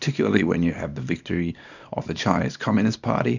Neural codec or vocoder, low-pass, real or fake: codec, 24 kHz, 0.9 kbps, WavTokenizer, small release; 7.2 kHz; fake